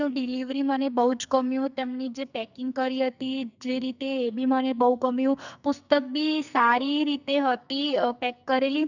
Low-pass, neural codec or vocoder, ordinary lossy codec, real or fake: 7.2 kHz; codec, 44.1 kHz, 2.6 kbps, SNAC; none; fake